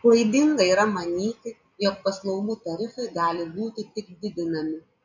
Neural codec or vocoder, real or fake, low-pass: none; real; 7.2 kHz